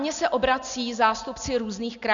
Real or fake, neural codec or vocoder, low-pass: real; none; 7.2 kHz